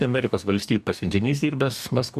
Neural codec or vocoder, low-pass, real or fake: codec, 44.1 kHz, 2.6 kbps, DAC; 14.4 kHz; fake